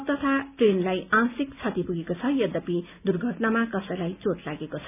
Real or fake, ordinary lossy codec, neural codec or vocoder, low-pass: real; none; none; 3.6 kHz